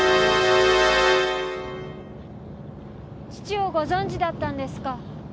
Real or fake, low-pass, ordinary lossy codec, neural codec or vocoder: real; none; none; none